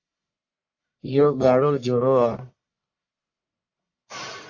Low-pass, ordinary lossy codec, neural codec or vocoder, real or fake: 7.2 kHz; AAC, 48 kbps; codec, 44.1 kHz, 1.7 kbps, Pupu-Codec; fake